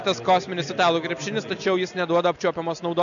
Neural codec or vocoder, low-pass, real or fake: none; 7.2 kHz; real